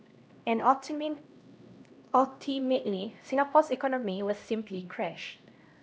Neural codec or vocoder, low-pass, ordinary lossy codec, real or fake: codec, 16 kHz, 1 kbps, X-Codec, HuBERT features, trained on LibriSpeech; none; none; fake